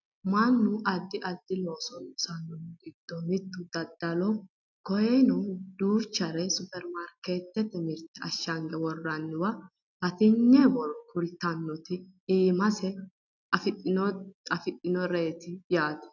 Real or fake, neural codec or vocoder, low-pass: real; none; 7.2 kHz